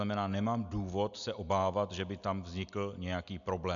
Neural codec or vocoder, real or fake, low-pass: none; real; 7.2 kHz